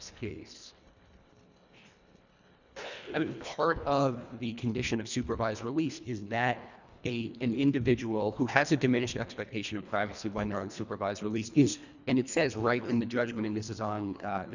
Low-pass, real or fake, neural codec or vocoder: 7.2 kHz; fake; codec, 24 kHz, 1.5 kbps, HILCodec